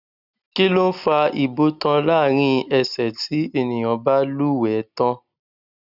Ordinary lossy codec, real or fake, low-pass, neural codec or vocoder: none; real; 5.4 kHz; none